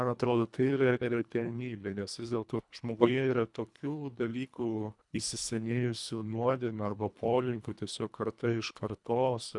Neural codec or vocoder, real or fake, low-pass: codec, 24 kHz, 1.5 kbps, HILCodec; fake; 10.8 kHz